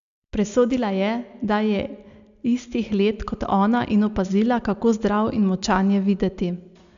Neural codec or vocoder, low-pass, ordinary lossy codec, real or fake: none; 7.2 kHz; AAC, 96 kbps; real